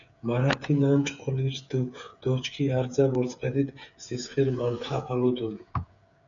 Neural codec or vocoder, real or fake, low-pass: codec, 16 kHz, 8 kbps, FreqCodec, smaller model; fake; 7.2 kHz